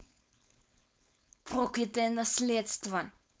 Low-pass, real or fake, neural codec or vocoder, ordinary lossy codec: none; fake; codec, 16 kHz, 4.8 kbps, FACodec; none